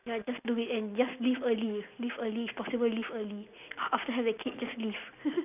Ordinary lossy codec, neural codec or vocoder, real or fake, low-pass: none; none; real; 3.6 kHz